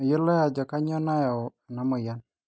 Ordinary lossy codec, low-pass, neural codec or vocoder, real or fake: none; none; none; real